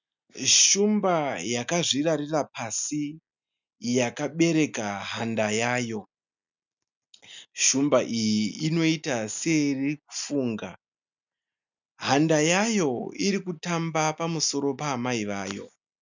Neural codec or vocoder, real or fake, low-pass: none; real; 7.2 kHz